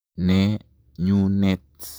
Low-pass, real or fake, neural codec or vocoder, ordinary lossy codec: none; fake; vocoder, 44.1 kHz, 128 mel bands, Pupu-Vocoder; none